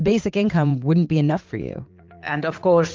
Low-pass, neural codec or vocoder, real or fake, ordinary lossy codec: 7.2 kHz; vocoder, 44.1 kHz, 80 mel bands, Vocos; fake; Opus, 32 kbps